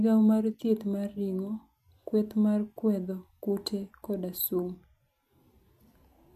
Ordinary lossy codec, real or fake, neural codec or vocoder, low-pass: MP3, 96 kbps; real; none; 14.4 kHz